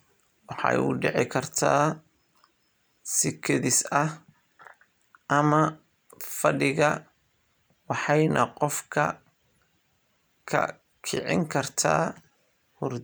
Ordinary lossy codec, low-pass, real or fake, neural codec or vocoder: none; none; real; none